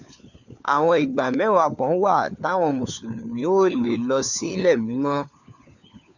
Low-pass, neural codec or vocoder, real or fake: 7.2 kHz; codec, 16 kHz, 4 kbps, FunCodec, trained on LibriTTS, 50 frames a second; fake